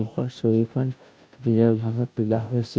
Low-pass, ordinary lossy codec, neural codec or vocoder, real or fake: none; none; codec, 16 kHz, 0.5 kbps, FunCodec, trained on Chinese and English, 25 frames a second; fake